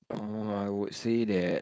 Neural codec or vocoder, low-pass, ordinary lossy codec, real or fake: codec, 16 kHz, 4.8 kbps, FACodec; none; none; fake